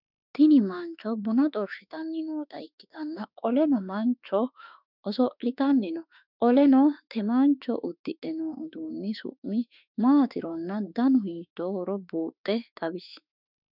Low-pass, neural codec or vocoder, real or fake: 5.4 kHz; autoencoder, 48 kHz, 32 numbers a frame, DAC-VAE, trained on Japanese speech; fake